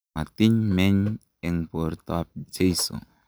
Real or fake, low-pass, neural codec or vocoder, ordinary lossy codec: real; none; none; none